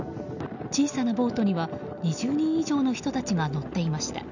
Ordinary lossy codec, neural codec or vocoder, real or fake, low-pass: none; none; real; 7.2 kHz